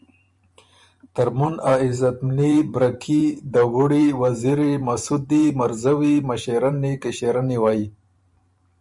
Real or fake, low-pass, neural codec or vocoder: fake; 10.8 kHz; vocoder, 44.1 kHz, 128 mel bands every 512 samples, BigVGAN v2